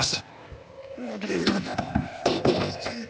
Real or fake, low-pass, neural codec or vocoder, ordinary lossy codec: fake; none; codec, 16 kHz, 0.8 kbps, ZipCodec; none